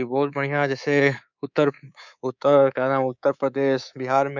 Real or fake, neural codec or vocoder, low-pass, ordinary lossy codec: fake; codec, 24 kHz, 3.1 kbps, DualCodec; 7.2 kHz; none